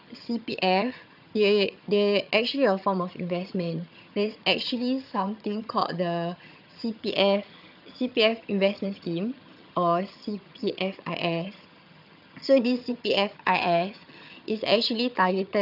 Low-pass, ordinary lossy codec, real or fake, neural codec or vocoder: 5.4 kHz; none; fake; vocoder, 22.05 kHz, 80 mel bands, HiFi-GAN